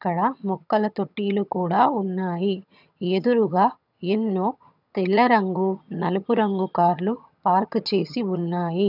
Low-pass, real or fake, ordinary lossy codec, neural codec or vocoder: 5.4 kHz; fake; none; vocoder, 22.05 kHz, 80 mel bands, HiFi-GAN